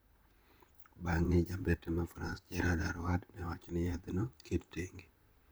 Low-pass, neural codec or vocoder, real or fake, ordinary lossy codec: none; vocoder, 44.1 kHz, 128 mel bands, Pupu-Vocoder; fake; none